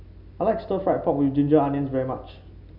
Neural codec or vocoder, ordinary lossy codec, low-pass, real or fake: none; none; 5.4 kHz; real